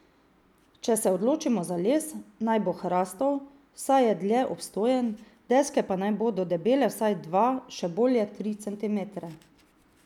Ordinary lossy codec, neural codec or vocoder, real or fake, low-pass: none; none; real; 19.8 kHz